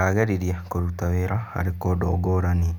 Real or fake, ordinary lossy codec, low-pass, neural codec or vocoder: real; none; 19.8 kHz; none